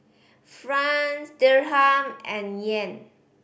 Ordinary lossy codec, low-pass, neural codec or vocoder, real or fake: none; none; none; real